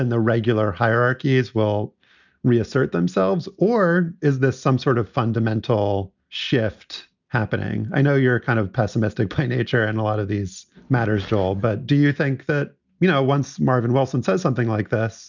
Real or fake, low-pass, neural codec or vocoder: real; 7.2 kHz; none